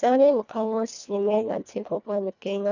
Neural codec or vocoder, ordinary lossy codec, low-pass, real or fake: codec, 24 kHz, 1.5 kbps, HILCodec; none; 7.2 kHz; fake